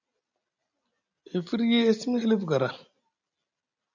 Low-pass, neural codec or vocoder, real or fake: 7.2 kHz; none; real